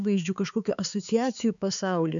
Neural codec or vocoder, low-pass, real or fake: codec, 16 kHz, 4 kbps, X-Codec, HuBERT features, trained on balanced general audio; 7.2 kHz; fake